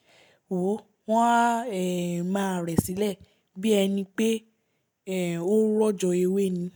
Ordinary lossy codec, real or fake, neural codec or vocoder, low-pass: none; real; none; none